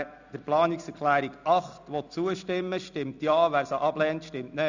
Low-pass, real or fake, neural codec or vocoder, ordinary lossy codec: 7.2 kHz; real; none; none